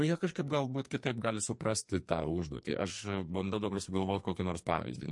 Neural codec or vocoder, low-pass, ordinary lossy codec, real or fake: codec, 44.1 kHz, 2.6 kbps, SNAC; 10.8 kHz; MP3, 48 kbps; fake